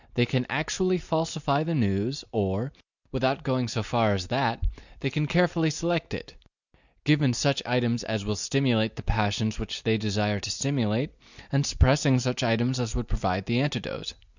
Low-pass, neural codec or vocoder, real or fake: 7.2 kHz; none; real